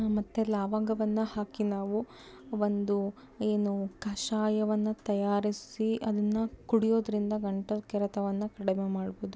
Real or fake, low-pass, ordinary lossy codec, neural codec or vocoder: real; none; none; none